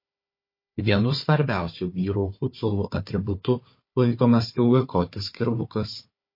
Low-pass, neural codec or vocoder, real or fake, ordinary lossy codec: 5.4 kHz; codec, 16 kHz, 4 kbps, FunCodec, trained on Chinese and English, 50 frames a second; fake; MP3, 32 kbps